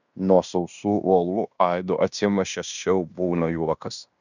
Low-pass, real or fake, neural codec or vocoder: 7.2 kHz; fake; codec, 16 kHz in and 24 kHz out, 0.9 kbps, LongCat-Audio-Codec, fine tuned four codebook decoder